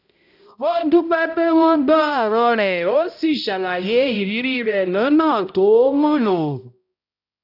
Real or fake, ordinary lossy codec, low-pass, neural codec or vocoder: fake; none; 5.4 kHz; codec, 16 kHz, 0.5 kbps, X-Codec, HuBERT features, trained on balanced general audio